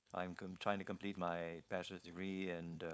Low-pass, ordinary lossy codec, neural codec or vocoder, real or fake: none; none; codec, 16 kHz, 4.8 kbps, FACodec; fake